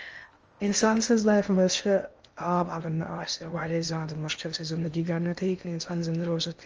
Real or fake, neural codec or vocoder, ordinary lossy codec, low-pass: fake; codec, 16 kHz in and 24 kHz out, 0.6 kbps, FocalCodec, streaming, 2048 codes; Opus, 24 kbps; 7.2 kHz